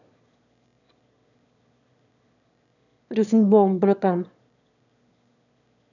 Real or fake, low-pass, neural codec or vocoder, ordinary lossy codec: fake; 7.2 kHz; autoencoder, 22.05 kHz, a latent of 192 numbers a frame, VITS, trained on one speaker; none